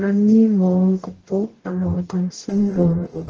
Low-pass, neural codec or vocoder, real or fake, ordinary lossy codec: 7.2 kHz; codec, 44.1 kHz, 0.9 kbps, DAC; fake; Opus, 16 kbps